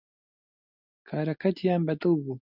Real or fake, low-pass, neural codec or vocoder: real; 5.4 kHz; none